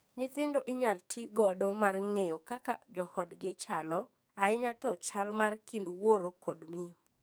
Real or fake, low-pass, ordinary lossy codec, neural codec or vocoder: fake; none; none; codec, 44.1 kHz, 2.6 kbps, SNAC